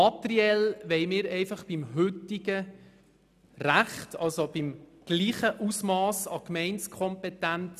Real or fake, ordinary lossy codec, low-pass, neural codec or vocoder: real; none; 14.4 kHz; none